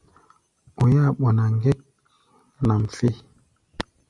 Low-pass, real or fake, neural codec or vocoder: 10.8 kHz; real; none